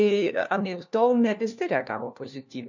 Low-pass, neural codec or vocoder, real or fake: 7.2 kHz; codec, 16 kHz, 1 kbps, FunCodec, trained on LibriTTS, 50 frames a second; fake